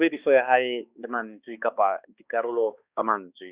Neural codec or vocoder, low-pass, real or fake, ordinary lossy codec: codec, 16 kHz, 2 kbps, X-Codec, HuBERT features, trained on balanced general audio; 3.6 kHz; fake; Opus, 64 kbps